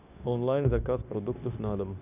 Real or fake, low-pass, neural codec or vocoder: fake; 3.6 kHz; codec, 16 kHz, 0.9 kbps, LongCat-Audio-Codec